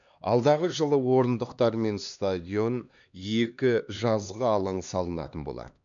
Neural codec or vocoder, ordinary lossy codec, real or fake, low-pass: codec, 16 kHz, 2 kbps, X-Codec, HuBERT features, trained on LibriSpeech; none; fake; 7.2 kHz